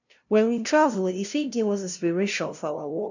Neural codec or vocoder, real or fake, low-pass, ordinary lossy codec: codec, 16 kHz, 0.5 kbps, FunCodec, trained on LibriTTS, 25 frames a second; fake; 7.2 kHz; none